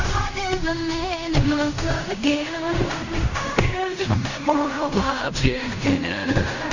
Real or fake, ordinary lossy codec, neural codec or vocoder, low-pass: fake; none; codec, 16 kHz in and 24 kHz out, 0.4 kbps, LongCat-Audio-Codec, fine tuned four codebook decoder; 7.2 kHz